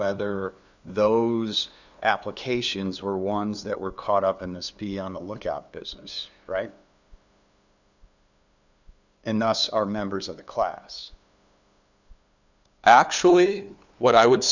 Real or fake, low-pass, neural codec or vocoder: fake; 7.2 kHz; codec, 16 kHz, 2 kbps, FunCodec, trained on LibriTTS, 25 frames a second